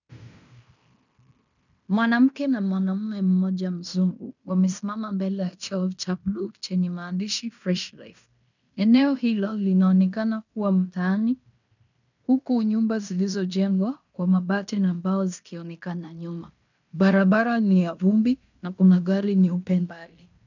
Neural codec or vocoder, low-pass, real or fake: codec, 16 kHz in and 24 kHz out, 0.9 kbps, LongCat-Audio-Codec, fine tuned four codebook decoder; 7.2 kHz; fake